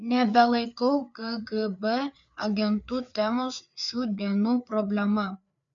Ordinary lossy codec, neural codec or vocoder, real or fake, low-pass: MP3, 64 kbps; codec, 16 kHz, 4 kbps, FreqCodec, larger model; fake; 7.2 kHz